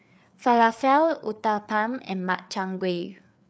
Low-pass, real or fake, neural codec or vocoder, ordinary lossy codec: none; fake; codec, 16 kHz, 4 kbps, FreqCodec, larger model; none